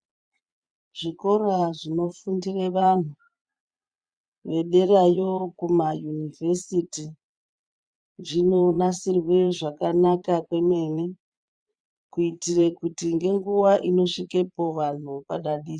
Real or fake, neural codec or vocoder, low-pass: fake; vocoder, 22.05 kHz, 80 mel bands, Vocos; 9.9 kHz